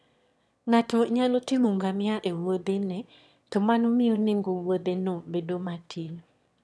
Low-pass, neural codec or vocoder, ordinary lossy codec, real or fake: none; autoencoder, 22.05 kHz, a latent of 192 numbers a frame, VITS, trained on one speaker; none; fake